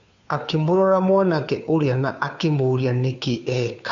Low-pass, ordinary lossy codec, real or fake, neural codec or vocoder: 7.2 kHz; AAC, 64 kbps; fake; codec, 16 kHz, 2 kbps, FunCodec, trained on Chinese and English, 25 frames a second